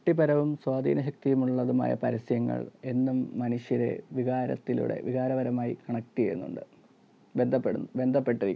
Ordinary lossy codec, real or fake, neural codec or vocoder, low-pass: none; real; none; none